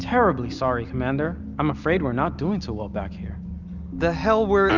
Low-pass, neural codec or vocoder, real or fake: 7.2 kHz; none; real